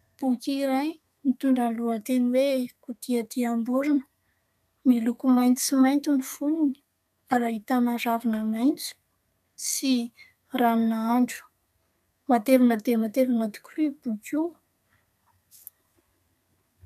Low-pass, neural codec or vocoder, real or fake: 14.4 kHz; codec, 32 kHz, 1.9 kbps, SNAC; fake